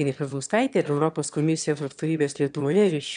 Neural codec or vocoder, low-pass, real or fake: autoencoder, 22.05 kHz, a latent of 192 numbers a frame, VITS, trained on one speaker; 9.9 kHz; fake